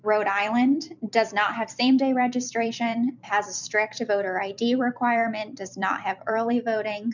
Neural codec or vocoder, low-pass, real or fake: vocoder, 44.1 kHz, 128 mel bands every 256 samples, BigVGAN v2; 7.2 kHz; fake